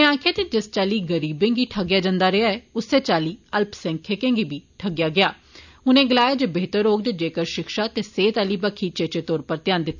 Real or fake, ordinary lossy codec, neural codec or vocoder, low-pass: real; none; none; 7.2 kHz